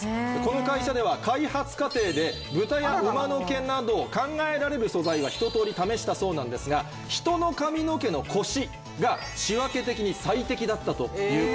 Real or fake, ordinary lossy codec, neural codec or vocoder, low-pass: real; none; none; none